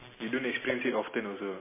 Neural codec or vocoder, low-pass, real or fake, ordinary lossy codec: none; 3.6 kHz; real; MP3, 16 kbps